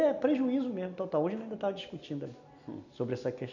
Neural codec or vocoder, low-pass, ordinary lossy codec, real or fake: none; 7.2 kHz; none; real